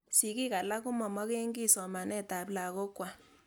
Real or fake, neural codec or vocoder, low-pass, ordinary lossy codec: real; none; none; none